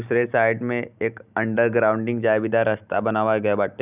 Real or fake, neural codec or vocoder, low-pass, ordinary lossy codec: real; none; 3.6 kHz; none